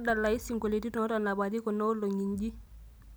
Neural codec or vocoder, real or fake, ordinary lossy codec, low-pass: none; real; none; none